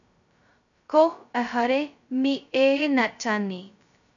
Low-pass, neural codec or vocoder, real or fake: 7.2 kHz; codec, 16 kHz, 0.2 kbps, FocalCodec; fake